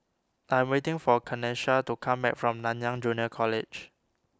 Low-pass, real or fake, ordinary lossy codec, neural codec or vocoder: none; real; none; none